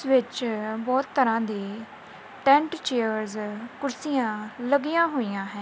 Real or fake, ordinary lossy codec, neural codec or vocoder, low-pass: real; none; none; none